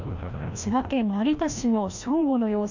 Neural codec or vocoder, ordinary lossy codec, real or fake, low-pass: codec, 16 kHz, 1 kbps, FreqCodec, larger model; none; fake; 7.2 kHz